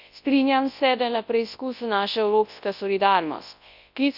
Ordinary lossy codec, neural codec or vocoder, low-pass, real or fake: none; codec, 24 kHz, 0.9 kbps, WavTokenizer, large speech release; 5.4 kHz; fake